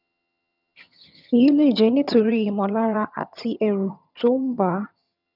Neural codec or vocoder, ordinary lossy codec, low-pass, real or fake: vocoder, 22.05 kHz, 80 mel bands, HiFi-GAN; none; 5.4 kHz; fake